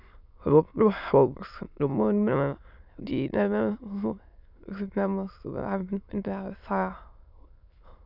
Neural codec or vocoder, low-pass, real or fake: autoencoder, 22.05 kHz, a latent of 192 numbers a frame, VITS, trained on many speakers; 5.4 kHz; fake